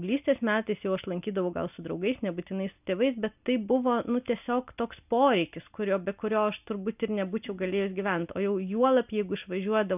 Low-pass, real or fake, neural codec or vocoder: 3.6 kHz; real; none